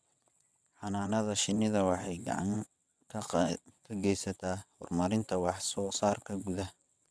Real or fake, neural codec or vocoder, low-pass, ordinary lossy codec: fake; vocoder, 22.05 kHz, 80 mel bands, WaveNeXt; none; none